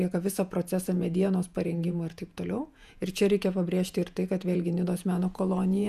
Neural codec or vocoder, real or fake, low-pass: vocoder, 48 kHz, 128 mel bands, Vocos; fake; 14.4 kHz